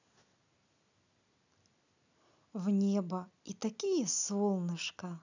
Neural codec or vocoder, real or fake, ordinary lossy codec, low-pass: none; real; none; 7.2 kHz